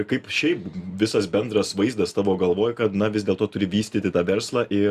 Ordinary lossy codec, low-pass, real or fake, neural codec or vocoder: Opus, 64 kbps; 14.4 kHz; real; none